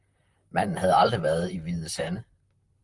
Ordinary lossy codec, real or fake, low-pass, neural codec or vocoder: Opus, 32 kbps; fake; 10.8 kHz; vocoder, 44.1 kHz, 128 mel bands, Pupu-Vocoder